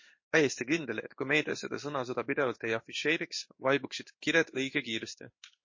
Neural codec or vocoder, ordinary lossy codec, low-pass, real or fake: codec, 16 kHz in and 24 kHz out, 1 kbps, XY-Tokenizer; MP3, 32 kbps; 7.2 kHz; fake